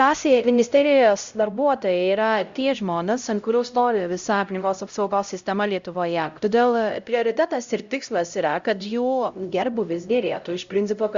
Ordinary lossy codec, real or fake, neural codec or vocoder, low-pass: Opus, 64 kbps; fake; codec, 16 kHz, 0.5 kbps, X-Codec, HuBERT features, trained on LibriSpeech; 7.2 kHz